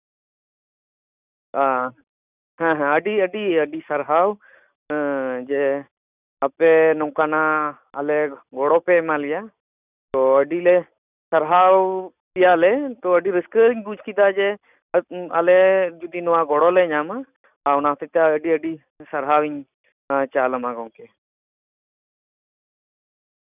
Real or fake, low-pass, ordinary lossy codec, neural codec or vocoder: real; 3.6 kHz; none; none